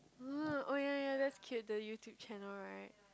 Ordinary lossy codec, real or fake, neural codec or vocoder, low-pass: none; real; none; none